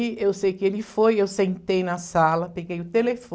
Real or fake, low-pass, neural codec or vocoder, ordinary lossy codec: real; none; none; none